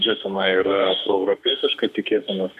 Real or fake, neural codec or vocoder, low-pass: fake; autoencoder, 48 kHz, 32 numbers a frame, DAC-VAE, trained on Japanese speech; 14.4 kHz